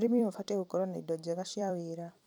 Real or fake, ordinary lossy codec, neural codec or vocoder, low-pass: fake; none; vocoder, 44.1 kHz, 128 mel bands every 256 samples, BigVGAN v2; 19.8 kHz